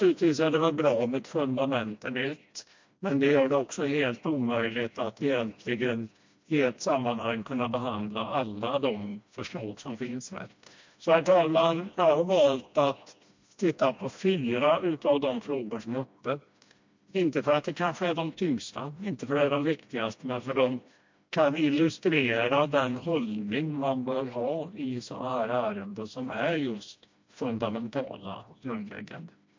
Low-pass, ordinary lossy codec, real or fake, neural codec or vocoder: 7.2 kHz; MP3, 48 kbps; fake; codec, 16 kHz, 1 kbps, FreqCodec, smaller model